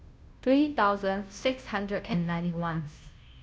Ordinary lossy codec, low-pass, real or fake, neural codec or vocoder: none; none; fake; codec, 16 kHz, 0.5 kbps, FunCodec, trained on Chinese and English, 25 frames a second